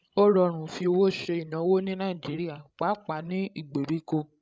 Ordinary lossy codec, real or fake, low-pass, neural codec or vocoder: none; fake; none; codec, 16 kHz, 16 kbps, FreqCodec, larger model